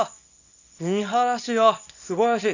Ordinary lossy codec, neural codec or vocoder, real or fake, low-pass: none; codec, 24 kHz, 0.9 kbps, WavTokenizer, small release; fake; 7.2 kHz